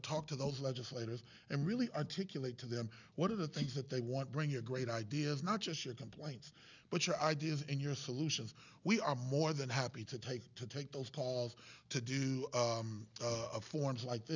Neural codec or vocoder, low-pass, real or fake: none; 7.2 kHz; real